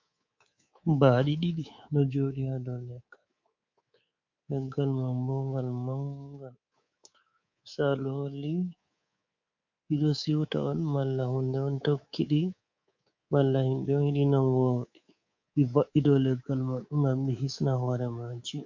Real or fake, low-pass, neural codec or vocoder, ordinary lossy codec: fake; 7.2 kHz; codec, 24 kHz, 3.1 kbps, DualCodec; MP3, 48 kbps